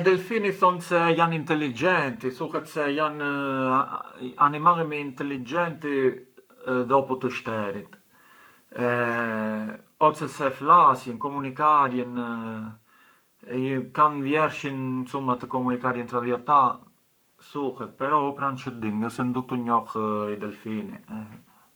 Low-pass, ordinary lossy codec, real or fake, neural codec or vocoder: none; none; fake; codec, 44.1 kHz, 7.8 kbps, Pupu-Codec